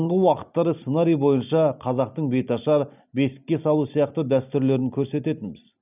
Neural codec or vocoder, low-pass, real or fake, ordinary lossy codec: none; 3.6 kHz; real; none